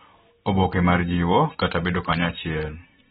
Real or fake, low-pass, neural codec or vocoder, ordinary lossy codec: real; 19.8 kHz; none; AAC, 16 kbps